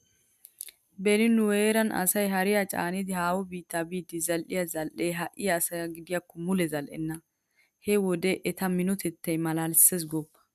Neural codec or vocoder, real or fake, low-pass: none; real; 14.4 kHz